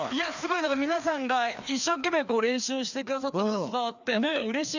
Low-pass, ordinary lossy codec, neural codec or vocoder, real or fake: 7.2 kHz; none; codec, 16 kHz, 2 kbps, FreqCodec, larger model; fake